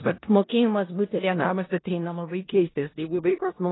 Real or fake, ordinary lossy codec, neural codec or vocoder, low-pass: fake; AAC, 16 kbps; codec, 16 kHz in and 24 kHz out, 0.4 kbps, LongCat-Audio-Codec, four codebook decoder; 7.2 kHz